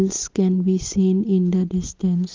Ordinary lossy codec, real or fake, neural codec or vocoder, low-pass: Opus, 24 kbps; real; none; 7.2 kHz